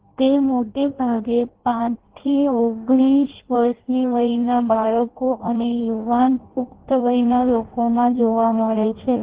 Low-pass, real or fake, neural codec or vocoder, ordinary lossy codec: 3.6 kHz; fake; codec, 16 kHz in and 24 kHz out, 0.6 kbps, FireRedTTS-2 codec; Opus, 16 kbps